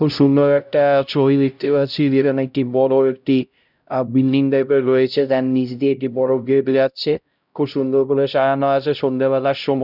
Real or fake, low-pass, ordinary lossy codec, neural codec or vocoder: fake; 5.4 kHz; none; codec, 16 kHz, 0.5 kbps, X-Codec, HuBERT features, trained on LibriSpeech